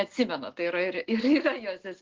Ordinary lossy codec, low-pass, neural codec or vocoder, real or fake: Opus, 16 kbps; 7.2 kHz; none; real